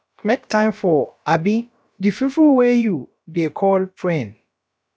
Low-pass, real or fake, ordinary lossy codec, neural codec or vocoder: none; fake; none; codec, 16 kHz, about 1 kbps, DyCAST, with the encoder's durations